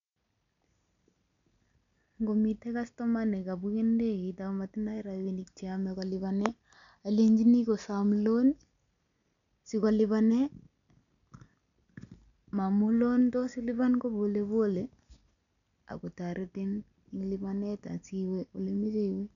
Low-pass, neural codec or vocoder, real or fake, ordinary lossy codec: 7.2 kHz; none; real; none